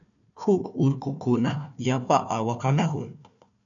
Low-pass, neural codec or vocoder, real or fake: 7.2 kHz; codec, 16 kHz, 1 kbps, FunCodec, trained on Chinese and English, 50 frames a second; fake